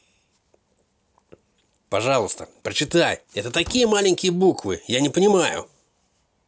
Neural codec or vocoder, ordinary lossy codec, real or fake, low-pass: none; none; real; none